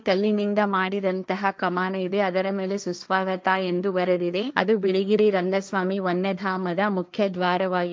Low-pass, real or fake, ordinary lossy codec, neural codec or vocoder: none; fake; none; codec, 16 kHz, 1.1 kbps, Voila-Tokenizer